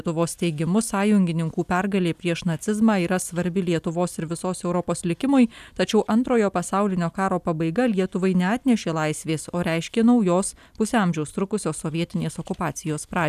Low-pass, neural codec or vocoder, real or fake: 14.4 kHz; none; real